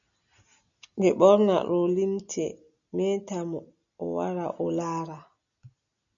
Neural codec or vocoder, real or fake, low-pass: none; real; 7.2 kHz